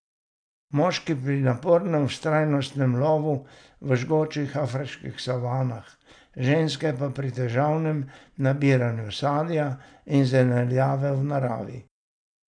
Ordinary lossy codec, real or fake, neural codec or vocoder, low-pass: none; real; none; 9.9 kHz